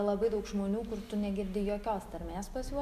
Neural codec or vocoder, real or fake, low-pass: none; real; 14.4 kHz